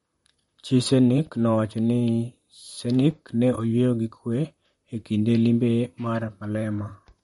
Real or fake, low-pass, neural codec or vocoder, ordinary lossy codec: fake; 19.8 kHz; vocoder, 44.1 kHz, 128 mel bands, Pupu-Vocoder; MP3, 48 kbps